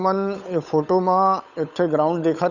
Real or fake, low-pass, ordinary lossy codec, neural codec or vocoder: fake; 7.2 kHz; none; codec, 16 kHz, 8 kbps, FunCodec, trained on Chinese and English, 25 frames a second